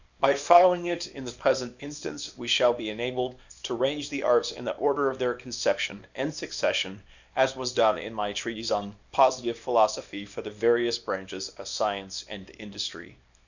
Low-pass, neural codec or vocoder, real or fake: 7.2 kHz; codec, 24 kHz, 0.9 kbps, WavTokenizer, small release; fake